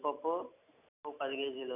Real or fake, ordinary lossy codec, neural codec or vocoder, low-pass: real; none; none; 3.6 kHz